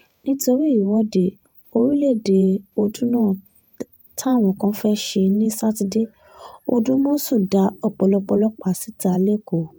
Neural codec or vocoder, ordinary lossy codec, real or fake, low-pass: vocoder, 48 kHz, 128 mel bands, Vocos; none; fake; none